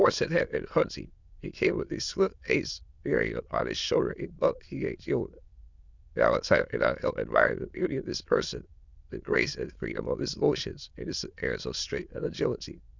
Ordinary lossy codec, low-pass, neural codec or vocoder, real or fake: Opus, 64 kbps; 7.2 kHz; autoencoder, 22.05 kHz, a latent of 192 numbers a frame, VITS, trained on many speakers; fake